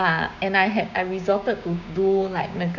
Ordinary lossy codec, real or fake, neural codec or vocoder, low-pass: none; fake; codec, 16 kHz, 6 kbps, DAC; 7.2 kHz